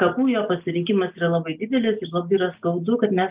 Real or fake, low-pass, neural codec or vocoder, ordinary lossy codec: real; 3.6 kHz; none; Opus, 24 kbps